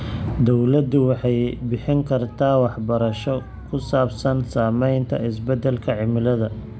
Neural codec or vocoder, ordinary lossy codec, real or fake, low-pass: none; none; real; none